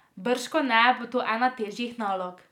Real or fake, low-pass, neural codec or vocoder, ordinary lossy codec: real; 19.8 kHz; none; none